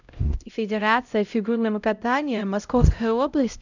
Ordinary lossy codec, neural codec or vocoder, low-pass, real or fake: none; codec, 16 kHz, 0.5 kbps, X-Codec, HuBERT features, trained on LibriSpeech; 7.2 kHz; fake